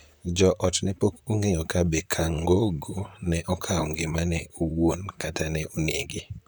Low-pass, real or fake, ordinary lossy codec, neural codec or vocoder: none; fake; none; vocoder, 44.1 kHz, 128 mel bands, Pupu-Vocoder